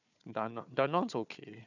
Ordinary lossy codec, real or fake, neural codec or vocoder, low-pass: none; fake; codec, 16 kHz, 4 kbps, FunCodec, trained on Chinese and English, 50 frames a second; 7.2 kHz